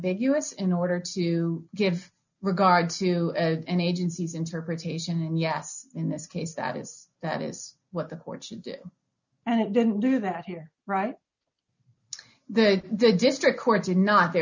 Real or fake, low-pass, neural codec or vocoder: real; 7.2 kHz; none